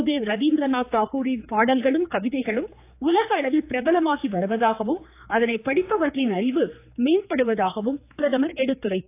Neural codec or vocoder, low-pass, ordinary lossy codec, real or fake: codec, 16 kHz, 4 kbps, X-Codec, HuBERT features, trained on general audio; 3.6 kHz; AAC, 24 kbps; fake